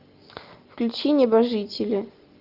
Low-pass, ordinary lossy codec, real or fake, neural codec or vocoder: 5.4 kHz; Opus, 24 kbps; real; none